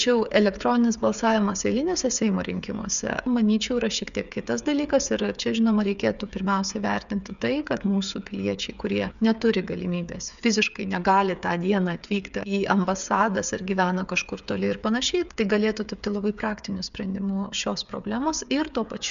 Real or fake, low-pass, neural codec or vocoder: fake; 7.2 kHz; codec, 16 kHz, 8 kbps, FreqCodec, smaller model